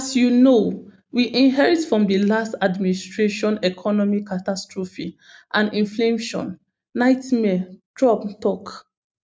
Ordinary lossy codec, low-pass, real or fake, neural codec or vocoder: none; none; real; none